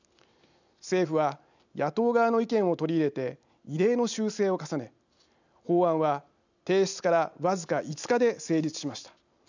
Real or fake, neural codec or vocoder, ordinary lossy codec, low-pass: real; none; none; 7.2 kHz